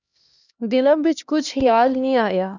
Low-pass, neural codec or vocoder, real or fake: 7.2 kHz; codec, 16 kHz, 1 kbps, X-Codec, HuBERT features, trained on LibriSpeech; fake